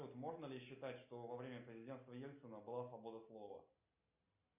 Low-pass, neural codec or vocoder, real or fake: 3.6 kHz; none; real